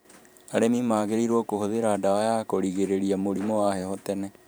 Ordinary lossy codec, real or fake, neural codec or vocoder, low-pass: none; fake; vocoder, 44.1 kHz, 128 mel bands every 512 samples, BigVGAN v2; none